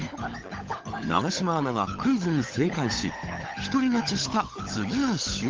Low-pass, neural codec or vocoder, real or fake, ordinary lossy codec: 7.2 kHz; codec, 16 kHz, 16 kbps, FunCodec, trained on LibriTTS, 50 frames a second; fake; Opus, 16 kbps